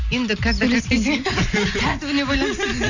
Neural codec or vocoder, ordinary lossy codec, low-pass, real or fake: none; none; 7.2 kHz; real